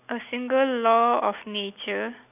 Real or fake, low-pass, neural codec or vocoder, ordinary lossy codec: real; 3.6 kHz; none; none